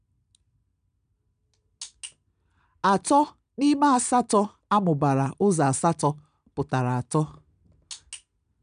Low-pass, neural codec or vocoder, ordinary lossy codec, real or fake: 9.9 kHz; none; none; real